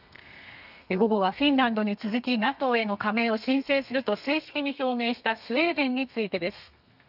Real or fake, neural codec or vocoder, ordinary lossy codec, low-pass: fake; codec, 32 kHz, 1.9 kbps, SNAC; none; 5.4 kHz